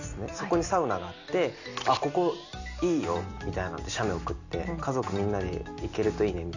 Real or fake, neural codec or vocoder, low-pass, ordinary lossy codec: real; none; 7.2 kHz; none